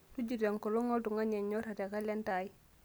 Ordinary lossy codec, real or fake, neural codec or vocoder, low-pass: none; real; none; none